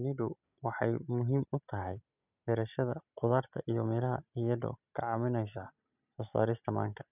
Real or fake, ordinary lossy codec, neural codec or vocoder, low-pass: real; none; none; 3.6 kHz